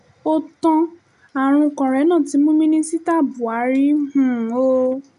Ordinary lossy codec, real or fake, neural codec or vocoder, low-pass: none; real; none; 10.8 kHz